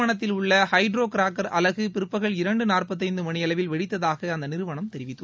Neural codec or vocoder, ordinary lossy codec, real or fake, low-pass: none; none; real; none